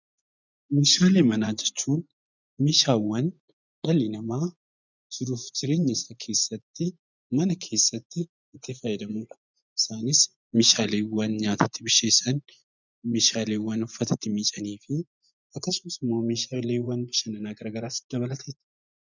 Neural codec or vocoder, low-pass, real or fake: vocoder, 24 kHz, 100 mel bands, Vocos; 7.2 kHz; fake